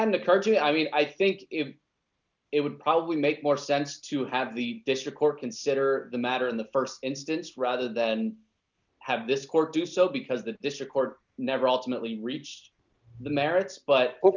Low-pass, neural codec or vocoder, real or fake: 7.2 kHz; none; real